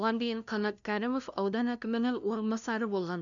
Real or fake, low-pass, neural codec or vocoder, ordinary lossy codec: fake; 7.2 kHz; codec, 16 kHz, 0.5 kbps, FunCodec, trained on LibriTTS, 25 frames a second; none